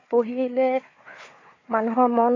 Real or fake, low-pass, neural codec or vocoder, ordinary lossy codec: fake; 7.2 kHz; codec, 16 kHz, 4 kbps, FunCodec, trained on Chinese and English, 50 frames a second; AAC, 32 kbps